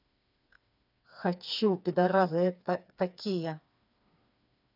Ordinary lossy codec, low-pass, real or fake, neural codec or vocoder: none; 5.4 kHz; fake; codec, 16 kHz, 4 kbps, FreqCodec, smaller model